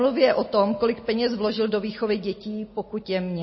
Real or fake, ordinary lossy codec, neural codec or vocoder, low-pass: real; MP3, 24 kbps; none; 7.2 kHz